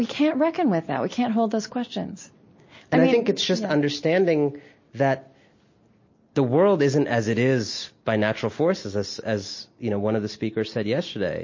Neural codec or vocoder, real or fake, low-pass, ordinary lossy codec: none; real; 7.2 kHz; MP3, 32 kbps